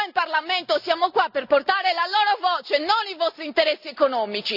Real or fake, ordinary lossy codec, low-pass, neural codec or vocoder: real; none; 5.4 kHz; none